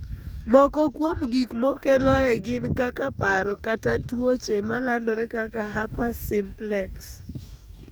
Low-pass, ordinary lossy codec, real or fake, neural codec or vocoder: none; none; fake; codec, 44.1 kHz, 2.6 kbps, DAC